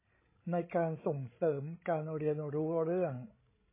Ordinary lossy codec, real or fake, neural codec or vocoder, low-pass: MP3, 16 kbps; fake; codec, 16 kHz, 16 kbps, FreqCodec, larger model; 3.6 kHz